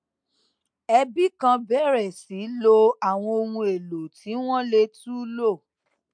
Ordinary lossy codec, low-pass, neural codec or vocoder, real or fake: MP3, 64 kbps; 9.9 kHz; none; real